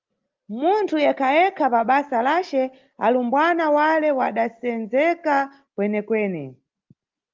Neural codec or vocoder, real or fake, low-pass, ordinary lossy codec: none; real; 7.2 kHz; Opus, 32 kbps